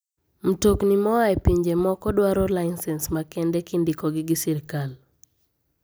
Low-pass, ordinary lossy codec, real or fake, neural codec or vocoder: none; none; real; none